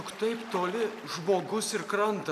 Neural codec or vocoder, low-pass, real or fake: none; 14.4 kHz; real